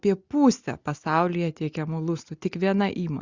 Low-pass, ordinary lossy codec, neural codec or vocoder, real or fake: 7.2 kHz; Opus, 64 kbps; none; real